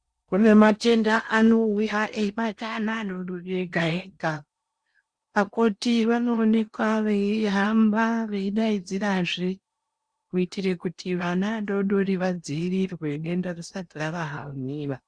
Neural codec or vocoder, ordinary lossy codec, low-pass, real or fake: codec, 16 kHz in and 24 kHz out, 0.8 kbps, FocalCodec, streaming, 65536 codes; Opus, 64 kbps; 9.9 kHz; fake